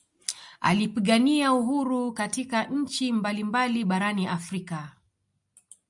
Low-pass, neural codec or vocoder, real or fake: 10.8 kHz; none; real